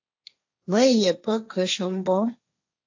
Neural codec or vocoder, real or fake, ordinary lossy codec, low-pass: codec, 16 kHz, 1.1 kbps, Voila-Tokenizer; fake; AAC, 48 kbps; 7.2 kHz